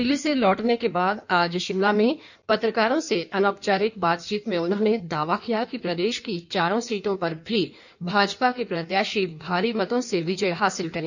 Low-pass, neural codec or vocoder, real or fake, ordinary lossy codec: 7.2 kHz; codec, 16 kHz in and 24 kHz out, 1.1 kbps, FireRedTTS-2 codec; fake; none